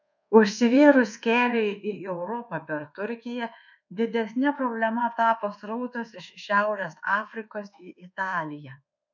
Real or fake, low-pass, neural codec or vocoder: fake; 7.2 kHz; codec, 24 kHz, 1.2 kbps, DualCodec